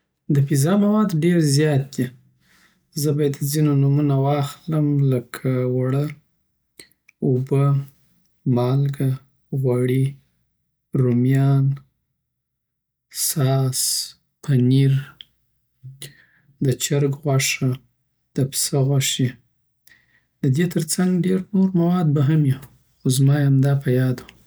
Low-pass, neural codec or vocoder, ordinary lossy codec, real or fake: none; none; none; real